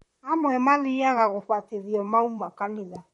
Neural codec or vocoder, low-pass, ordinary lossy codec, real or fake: vocoder, 44.1 kHz, 128 mel bands, Pupu-Vocoder; 19.8 kHz; MP3, 48 kbps; fake